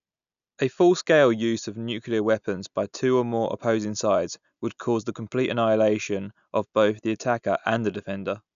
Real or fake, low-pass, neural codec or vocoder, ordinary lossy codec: real; 7.2 kHz; none; none